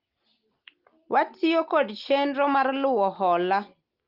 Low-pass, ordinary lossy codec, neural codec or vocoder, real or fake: 5.4 kHz; Opus, 24 kbps; none; real